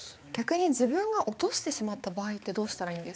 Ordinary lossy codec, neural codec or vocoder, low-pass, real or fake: none; codec, 16 kHz, 4 kbps, X-Codec, HuBERT features, trained on balanced general audio; none; fake